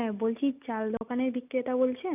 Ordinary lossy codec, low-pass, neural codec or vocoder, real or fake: none; 3.6 kHz; none; real